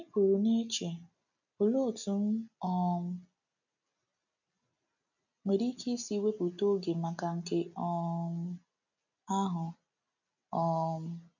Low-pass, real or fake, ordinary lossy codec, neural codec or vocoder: 7.2 kHz; real; none; none